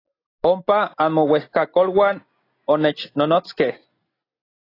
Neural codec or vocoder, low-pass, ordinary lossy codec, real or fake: none; 5.4 kHz; AAC, 24 kbps; real